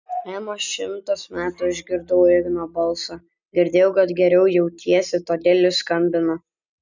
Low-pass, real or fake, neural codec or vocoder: 7.2 kHz; real; none